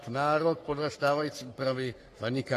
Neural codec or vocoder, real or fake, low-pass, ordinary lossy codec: codec, 44.1 kHz, 3.4 kbps, Pupu-Codec; fake; 14.4 kHz; AAC, 48 kbps